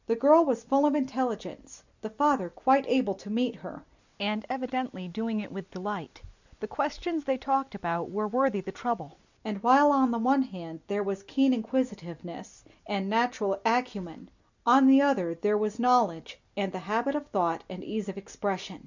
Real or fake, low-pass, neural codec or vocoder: real; 7.2 kHz; none